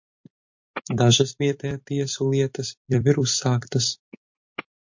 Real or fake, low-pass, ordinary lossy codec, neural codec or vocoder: fake; 7.2 kHz; MP3, 48 kbps; vocoder, 44.1 kHz, 128 mel bands every 256 samples, BigVGAN v2